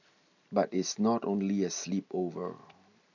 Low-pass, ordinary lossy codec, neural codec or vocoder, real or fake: 7.2 kHz; none; none; real